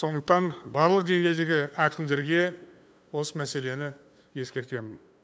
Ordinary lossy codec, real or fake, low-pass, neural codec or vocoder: none; fake; none; codec, 16 kHz, 2 kbps, FunCodec, trained on LibriTTS, 25 frames a second